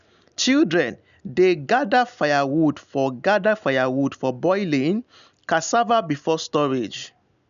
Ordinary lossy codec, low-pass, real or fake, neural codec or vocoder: none; 7.2 kHz; real; none